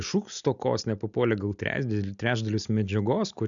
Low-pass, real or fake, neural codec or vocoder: 7.2 kHz; real; none